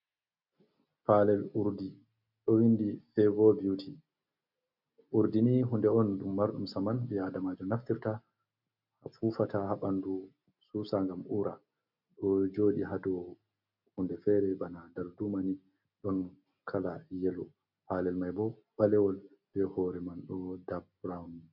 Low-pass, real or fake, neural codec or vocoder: 5.4 kHz; real; none